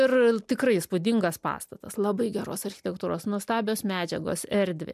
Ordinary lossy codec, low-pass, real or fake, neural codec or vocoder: MP3, 96 kbps; 14.4 kHz; real; none